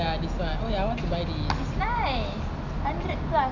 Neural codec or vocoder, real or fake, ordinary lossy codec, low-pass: none; real; none; 7.2 kHz